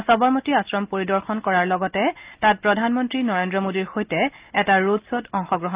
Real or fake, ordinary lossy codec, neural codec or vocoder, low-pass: real; Opus, 24 kbps; none; 3.6 kHz